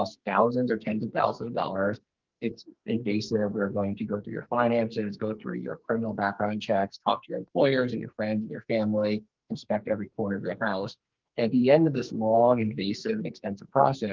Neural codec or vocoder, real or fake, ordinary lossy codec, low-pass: codec, 44.1 kHz, 2.6 kbps, SNAC; fake; Opus, 32 kbps; 7.2 kHz